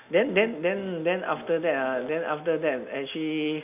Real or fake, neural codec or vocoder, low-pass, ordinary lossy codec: real; none; 3.6 kHz; none